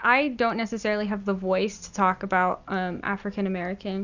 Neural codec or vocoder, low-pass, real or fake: none; 7.2 kHz; real